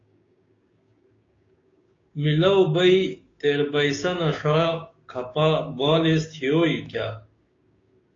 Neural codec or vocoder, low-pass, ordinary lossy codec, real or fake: codec, 16 kHz, 6 kbps, DAC; 7.2 kHz; AAC, 32 kbps; fake